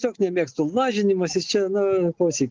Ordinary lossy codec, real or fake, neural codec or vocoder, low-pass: Opus, 16 kbps; real; none; 7.2 kHz